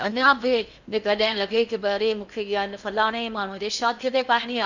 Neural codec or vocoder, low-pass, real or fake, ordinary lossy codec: codec, 16 kHz in and 24 kHz out, 0.8 kbps, FocalCodec, streaming, 65536 codes; 7.2 kHz; fake; none